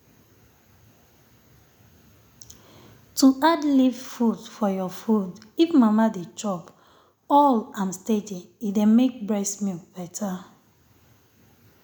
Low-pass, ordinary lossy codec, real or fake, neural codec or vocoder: 19.8 kHz; none; real; none